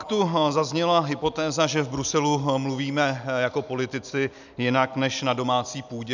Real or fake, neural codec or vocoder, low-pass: real; none; 7.2 kHz